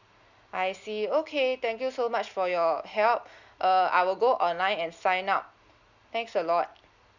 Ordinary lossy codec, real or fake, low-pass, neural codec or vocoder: none; real; 7.2 kHz; none